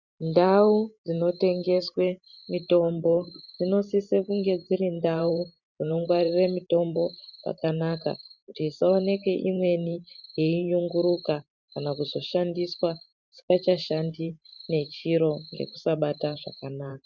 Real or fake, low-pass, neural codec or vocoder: fake; 7.2 kHz; vocoder, 44.1 kHz, 128 mel bands every 512 samples, BigVGAN v2